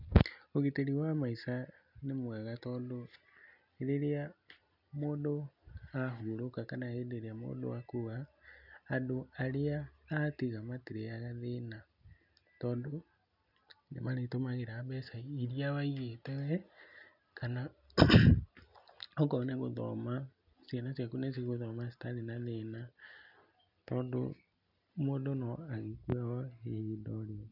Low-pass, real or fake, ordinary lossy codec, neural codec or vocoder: 5.4 kHz; real; none; none